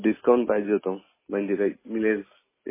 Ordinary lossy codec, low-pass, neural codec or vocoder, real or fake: MP3, 16 kbps; 3.6 kHz; none; real